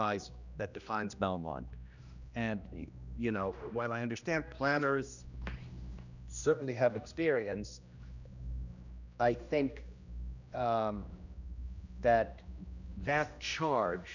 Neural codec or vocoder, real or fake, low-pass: codec, 16 kHz, 1 kbps, X-Codec, HuBERT features, trained on general audio; fake; 7.2 kHz